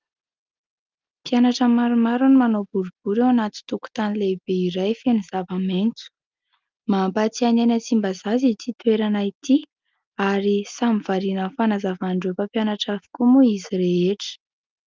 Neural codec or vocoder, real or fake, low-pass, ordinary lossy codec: none; real; 7.2 kHz; Opus, 24 kbps